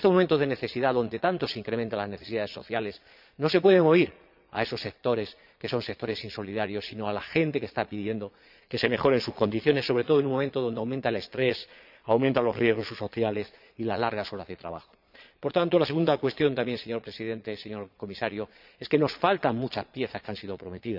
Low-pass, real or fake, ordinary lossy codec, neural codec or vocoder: 5.4 kHz; fake; none; vocoder, 22.05 kHz, 80 mel bands, Vocos